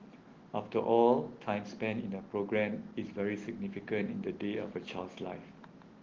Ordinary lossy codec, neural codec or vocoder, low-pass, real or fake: Opus, 16 kbps; none; 7.2 kHz; real